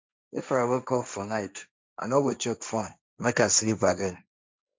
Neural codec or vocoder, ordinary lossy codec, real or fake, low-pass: codec, 16 kHz, 1.1 kbps, Voila-Tokenizer; none; fake; none